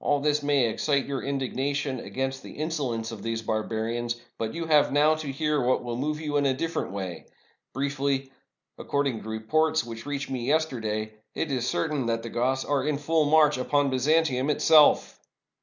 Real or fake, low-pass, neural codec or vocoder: real; 7.2 kHz; none